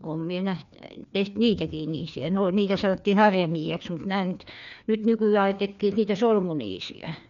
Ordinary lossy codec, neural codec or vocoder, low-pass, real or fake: none; codec, 16 kHz, 2 kbps, FreqCodec, larger model; 7.2 kHz; fake